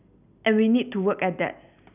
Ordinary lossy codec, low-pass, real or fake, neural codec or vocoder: none; 3.6 kHz; real; none